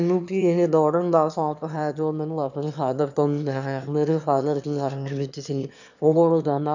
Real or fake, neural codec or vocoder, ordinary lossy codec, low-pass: fake; autoencoder, 22.05 kHz, a latent of 192 numbers a frame, VITS, trained on one speaker; none; 7.2 kHz